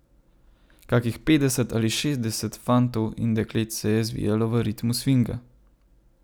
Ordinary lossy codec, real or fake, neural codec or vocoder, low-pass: none; real; none; none